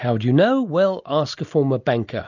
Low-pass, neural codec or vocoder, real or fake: 7.2 kHz; none; real